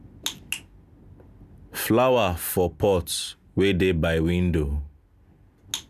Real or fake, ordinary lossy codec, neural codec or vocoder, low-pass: real; none; none; 14.4 kHz